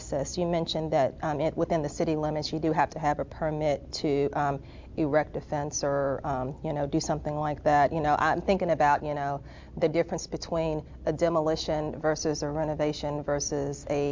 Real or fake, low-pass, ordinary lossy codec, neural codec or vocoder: real; 7.2 kHz; MP3, 64 kbps; none